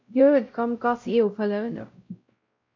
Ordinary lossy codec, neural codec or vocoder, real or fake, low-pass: MP3, 48 kbps; codec, 16 kHz, 0.5 kbps, X-Codec, WavLM features, trained on Multilingual LibriSpeech; fake; 7.2 kHz